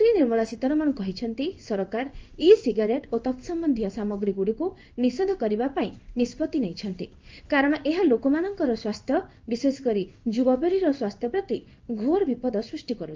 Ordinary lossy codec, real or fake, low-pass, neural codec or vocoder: Opus, 24 kbps; fake; 7.2 kHz; codec, 16 kHz in and 24 kHz out, 1 kbps, XY-Tokenizer